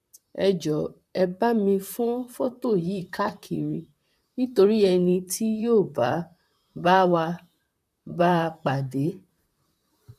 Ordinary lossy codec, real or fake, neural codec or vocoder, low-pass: none; fake; vocoder, 44.1 kHz, 128 mel bands, Pupu-Vocoder; 14.4 kHz